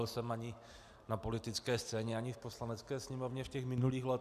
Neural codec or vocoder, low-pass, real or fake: none; 14.4 kHz; real